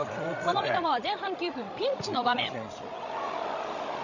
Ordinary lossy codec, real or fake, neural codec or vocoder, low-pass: none; fake; codec, 16 kHz, 16 kbps, FreqCodec, larger model; 7.2 kHz